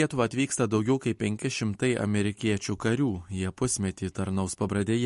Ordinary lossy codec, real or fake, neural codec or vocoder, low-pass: MP3, 48 kbps; real; none; 14.4 kHz